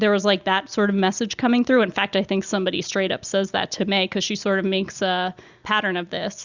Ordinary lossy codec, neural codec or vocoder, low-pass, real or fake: Opus, 64 kbps; none; 7.2 kHz; real